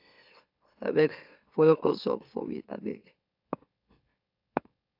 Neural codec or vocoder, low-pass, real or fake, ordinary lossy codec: autoencoder, 44.1 kHz, a latent of 192 numbers a frame, MeloTTS; 5.4 kHz; fake; AAC, 48 kbps